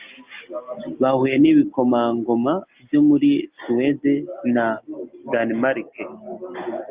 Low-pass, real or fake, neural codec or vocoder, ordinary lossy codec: 3.6 kHz; real; none; Opus, 24 kbps